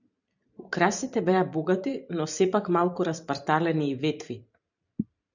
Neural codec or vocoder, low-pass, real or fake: vocoder, 44.1 kHz, 128 mel bands every 512 samples, BigVGAN v2; 7.2 kHz; fake